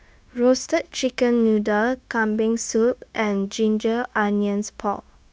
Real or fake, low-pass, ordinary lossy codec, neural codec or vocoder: fake; none; none; codec, 16 kHz, 0.9 kbps, LongCat-Audio-Codec